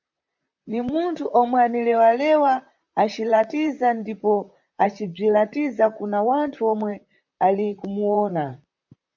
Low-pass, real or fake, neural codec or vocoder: 7.2 kHz; fake; vocoder, 44.1 kHz, 128 mel bands, Pupu-Vocoder